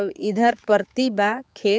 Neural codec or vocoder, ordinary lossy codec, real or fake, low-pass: codec, 16 kHz, 4 kbps, X-Codec, HuBERT features, trained on general audio; none; fake; none